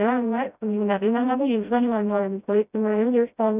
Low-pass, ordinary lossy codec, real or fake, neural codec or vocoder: 3.6 kHz; none; fake; codec, 16 kHz, 0.5 kbps, FreqCodec, smaller model